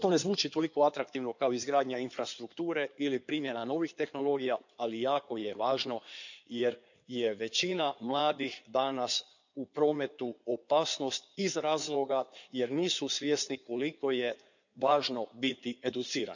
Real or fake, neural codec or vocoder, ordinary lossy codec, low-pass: fake; codec, 16 kHz in and 24 kHz out, 2.2 kbps, FireRedTTS-2 codec; none; 7.2 kHz